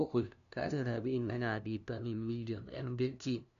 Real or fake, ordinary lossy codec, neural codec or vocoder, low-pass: fake; none; codec, 16 kHz, 0.5 kbps, FunCodec, trained on LibriTTS, 25 frames a second; 7.2 kHz